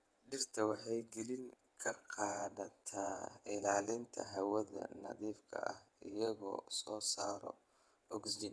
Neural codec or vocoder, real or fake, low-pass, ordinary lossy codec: vocoder, 22.05 kHz, 80 mel bands, WaveNeXt; fake; none; none